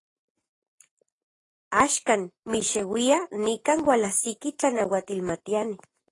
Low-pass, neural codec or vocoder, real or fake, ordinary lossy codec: 10.8 kHz; none; real; AAC, 32 kbps